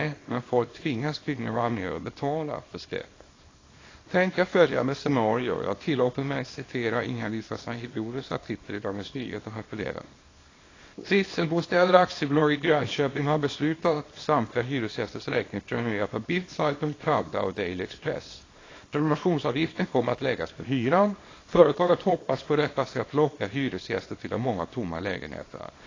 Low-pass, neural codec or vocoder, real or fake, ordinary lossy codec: 7.2 kHz; codec, 24 kHz, 0.9 kbps, WavTokenizer, small release; fake; AAC, 32 kbps